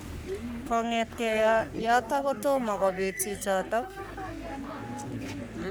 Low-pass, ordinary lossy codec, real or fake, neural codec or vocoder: none; none; fake; codec, 44.1 kHz, 3.4 kbps, Pupu-Codec